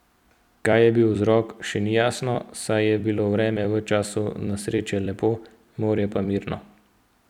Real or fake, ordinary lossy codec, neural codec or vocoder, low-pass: fake; none; vocoder, 44.1 kHz, 128 mel bands every 256 samples, BigVGAN v2; 19.8 kHz